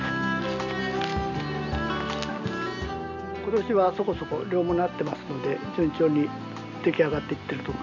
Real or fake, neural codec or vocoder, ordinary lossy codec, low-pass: real; none; none; 7.2 kHz